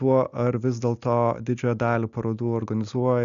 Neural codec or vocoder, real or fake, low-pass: none; real; 7.2 kHz